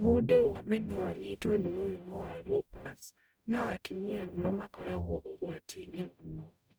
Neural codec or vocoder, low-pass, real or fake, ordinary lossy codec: codec, 44.1 kHz, 0.9 kbps, DAC; none; fake; none